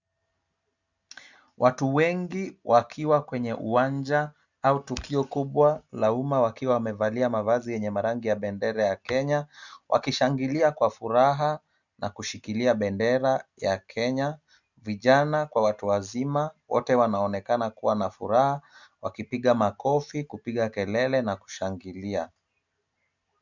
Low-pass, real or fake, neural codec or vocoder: 7.2 kHz; real; none